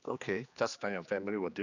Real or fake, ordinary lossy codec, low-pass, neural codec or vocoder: fake; none; 7.2 kHz; codec, 16 kHz, 2 kbps, X-Codec, HuBERT features, trained on general audio